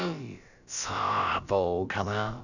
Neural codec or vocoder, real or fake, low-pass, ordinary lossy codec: codec, 16 kHz, about 1 kbps, DyCAST, with the encoder's durations; fake; 7.2 kHz; none